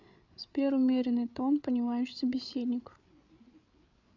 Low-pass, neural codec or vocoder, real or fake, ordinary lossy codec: 7.2 kHz; codec, 16 kHz, 8 kbps, FreqCodec, larger model; fake; none